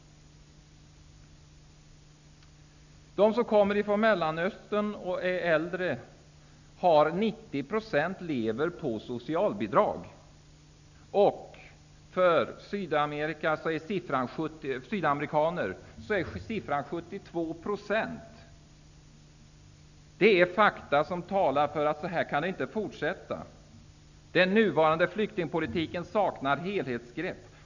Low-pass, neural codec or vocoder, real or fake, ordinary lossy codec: 7.2 kHz; none; real; none